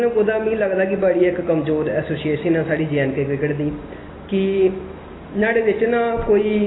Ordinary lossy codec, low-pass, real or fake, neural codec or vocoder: AAC, 16 kbps; 7.2 kHz; real; none